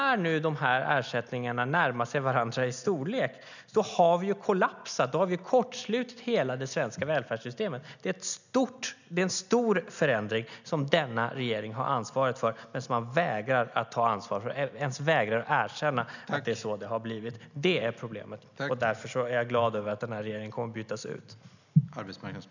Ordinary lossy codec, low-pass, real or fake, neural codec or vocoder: none; 7.2 kHz; real; none